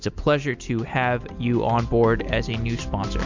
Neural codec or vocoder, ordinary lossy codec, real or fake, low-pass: none; MP3, 64 kbps; real; 7.2 kHz